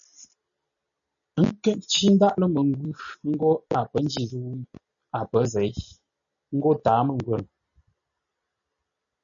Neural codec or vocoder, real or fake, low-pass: none; real; 7.2 kHz